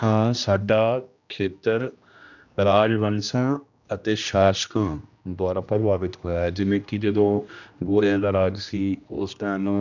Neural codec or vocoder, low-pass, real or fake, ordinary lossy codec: codec, 16 kHz, 1 kbps, X-Codec, HuBERT features, trained on general audio; 7.2 kHz; fake; none